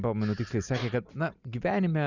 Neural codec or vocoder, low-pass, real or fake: none; 7.2 kHz; real